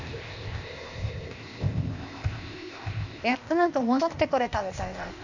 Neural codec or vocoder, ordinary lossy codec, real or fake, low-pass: codec, 16 kHz, 0.8 kbps, ZipCodec; none; fake; 7.2 kHz